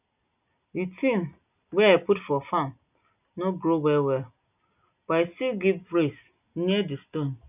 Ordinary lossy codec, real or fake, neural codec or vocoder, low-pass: none; real; none; 3.6 kHz